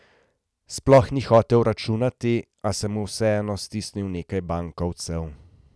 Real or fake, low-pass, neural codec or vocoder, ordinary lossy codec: real; none; none; none